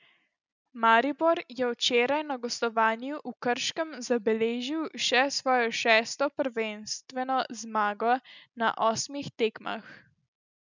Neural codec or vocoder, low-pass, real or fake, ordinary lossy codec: none; 7.2 kHz; real; none